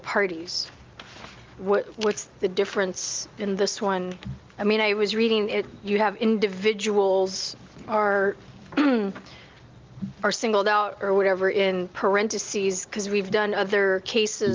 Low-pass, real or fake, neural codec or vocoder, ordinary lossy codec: 7.2 kHz; real; none; Opus, 32 kbps